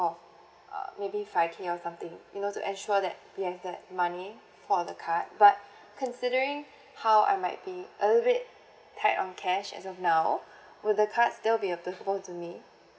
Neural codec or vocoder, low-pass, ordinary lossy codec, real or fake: none; none; none; real